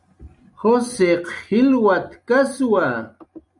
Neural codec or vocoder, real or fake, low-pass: none; real; 10.8 kHz